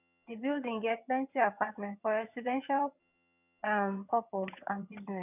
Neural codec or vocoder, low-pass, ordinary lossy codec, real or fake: vocoder, 22.05 kHz, 80 mel bands, HiFi-GAN; 3.6 kHz; none; fake